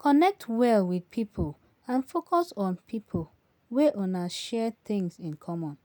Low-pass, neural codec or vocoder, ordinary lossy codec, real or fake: none; none; none; real